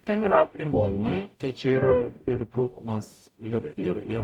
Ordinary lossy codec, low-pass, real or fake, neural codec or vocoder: Opus, 64 kbps; 19.8 kHz; fake; codec, 44.1 kHz, 0.9 kbps, DAC